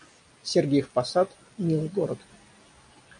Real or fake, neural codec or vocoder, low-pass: real; none; 9.9 kHz